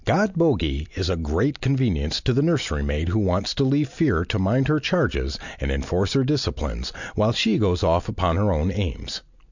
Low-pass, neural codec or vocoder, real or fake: 7.2 kHz; none; real